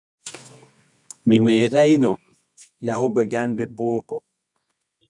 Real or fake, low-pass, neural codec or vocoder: fake; 10.8 kHz; codec, 24 kHz, 0.9 kbps, WavTokenizer, medium music audio release